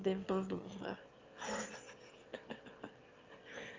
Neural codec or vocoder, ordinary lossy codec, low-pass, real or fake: autoencoder, 22.05 kHz, a latent of 192 numbers a frame, VITS, trained on one speaker; Opus, 32 kbps; 7.2 kHz; fake